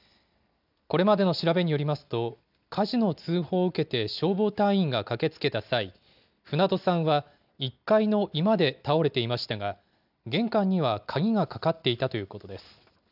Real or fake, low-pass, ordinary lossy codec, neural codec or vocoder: real; 5.4 kHz; none; none